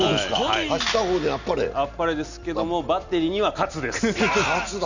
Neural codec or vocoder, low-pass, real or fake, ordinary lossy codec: none; 7.2 kHz; real; none